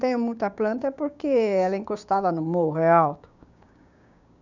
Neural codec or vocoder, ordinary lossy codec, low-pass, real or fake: codec, 16 kHz, 6 kbps, DAC; none; 7.2 kHz; fake